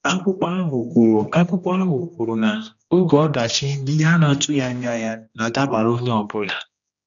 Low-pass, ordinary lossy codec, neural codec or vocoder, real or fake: 7.2 kHz; none; codec, 16 kHz, 1 kbps, X-Codec, HuBERT features, trained on general audio; fake